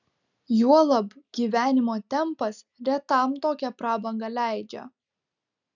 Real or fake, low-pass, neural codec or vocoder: real; 7.2 kHz; none